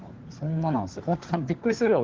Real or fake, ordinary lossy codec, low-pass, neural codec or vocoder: fake; Opus, 16 kbps; 7.2 kHz; codec, 16 kHz, 4 kbps, FunCodec, trained on LibriTTS, 50 frames a second